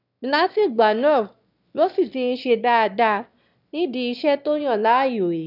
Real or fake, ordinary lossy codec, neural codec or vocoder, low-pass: fake; none; autoencoder, 22.05 kHz, a latent of 192 numbers a frame, VITS, trained on one speaker; 5.4 kHz